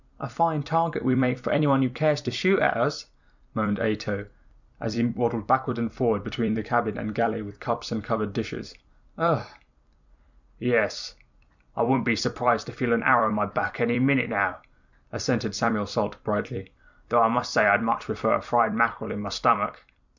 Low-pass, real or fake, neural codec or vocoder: 7.2 kHz; fake; vocoder, 44.1 kHz, 128 mel bands every 256 samples, BigVGAN v2